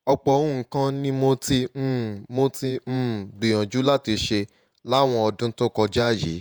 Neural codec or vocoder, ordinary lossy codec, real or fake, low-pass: vocoder, 48 kHz, 128 mel bands, Vocos; none; fake; none